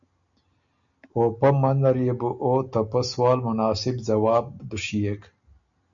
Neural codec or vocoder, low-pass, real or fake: none; 7.2 kHz; real